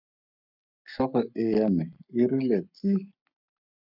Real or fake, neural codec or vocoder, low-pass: fake; codec, 44.1 kHz, 7.8 kbps, Pupu-Codec; 5.4 kHz